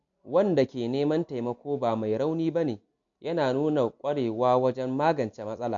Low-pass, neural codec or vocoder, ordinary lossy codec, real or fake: 7.2 kHz; none; MP3, 64 kbps; real